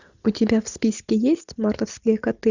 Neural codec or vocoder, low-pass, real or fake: none; 7.2 kHz; real